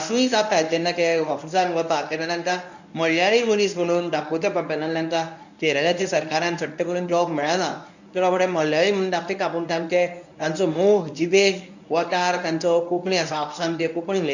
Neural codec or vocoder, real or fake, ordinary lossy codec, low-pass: codec, 24 kHz, 0.9 kbps, WavTokenizer, medium speech release version 1; fake; none; 7.2 kHz